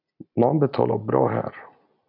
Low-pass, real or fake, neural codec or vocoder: 5.4 kHz; real; none